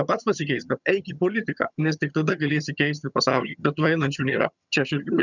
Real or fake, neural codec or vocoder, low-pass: fake; vocoder, 22.05 kHz, 80 mel bands, HiFi-GAN; 7.2 kHz